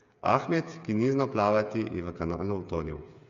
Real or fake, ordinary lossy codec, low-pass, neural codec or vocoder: fake; MP3, 48 kbps; 7.2 kHz; codec, 16 kHz, 8 kbps, FreqCodec, smaller model